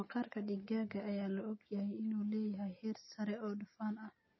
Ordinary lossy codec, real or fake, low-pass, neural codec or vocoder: MP3, 24 kbps; real; 7.2 kHz; none